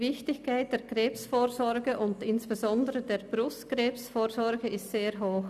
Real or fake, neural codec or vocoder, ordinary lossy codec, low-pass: real; none; none; 14.4 kHz